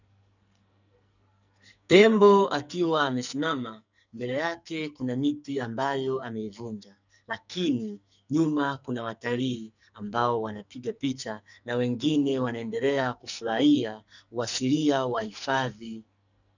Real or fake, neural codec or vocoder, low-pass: fake; codec, 44.1 kHz, 2.6 kbps, SNAC; 7.2 kHz